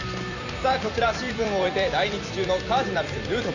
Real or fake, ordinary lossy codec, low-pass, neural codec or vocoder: fake; none; 7.2 kHz; vocoder, 44.1 kHz, 128 mel bands every 256 samples, BigVGAN v2